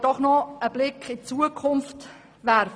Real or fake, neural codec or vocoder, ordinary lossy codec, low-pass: real; none; none; none